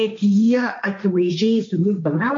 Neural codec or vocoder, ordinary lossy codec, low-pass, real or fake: codec, 16 kHz, 1.1 kbps, Voila-Tokenizer; MP3, 48 kbps; 7.2 kHz; fake